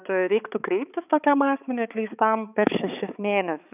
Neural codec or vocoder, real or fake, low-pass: codec, 16 kHz, 4 kbps, X-Codec, HuBERT features, trained on balanced general audio; fake; 3.6 kHz